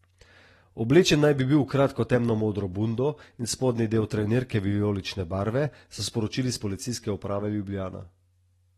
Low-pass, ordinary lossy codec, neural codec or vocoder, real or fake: 19.8 kHz; AAC, 32 kbps; none; real